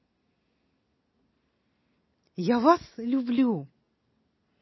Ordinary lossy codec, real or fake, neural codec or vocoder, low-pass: MP3, 24 kbps; real; none; 7.2 kHz